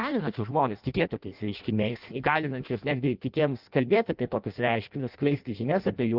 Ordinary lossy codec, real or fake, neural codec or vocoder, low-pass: Opus, 32 kbps; fake; codec, 16 kHz in and 24 kHz out, 0.6 kbps, FireRedTTS-2 codec; 5.4 kHz